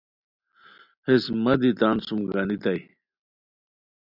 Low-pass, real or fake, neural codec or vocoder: 5.4 kHz; real; none